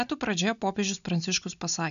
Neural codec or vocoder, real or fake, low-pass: none; real; 7.2 kHz